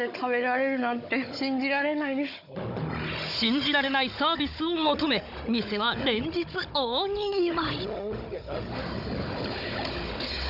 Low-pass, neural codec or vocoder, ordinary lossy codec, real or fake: 5.4 kHz; codec, 16 kHz, 16 kbps, FunCodec, trained on Chinese and English, 50 frames a second; none; fake